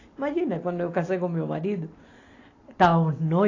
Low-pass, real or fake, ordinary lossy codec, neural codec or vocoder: 7.2 kHz; real; AAC, 32 kbps; none